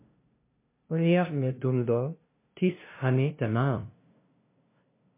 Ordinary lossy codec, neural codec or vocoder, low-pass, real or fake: MP3, 24 kbps; codec, 16 kHz, 0.5 kbps, FunCodec, trained on LibriTTS, 25 frames a second; 3.6 kHz; fake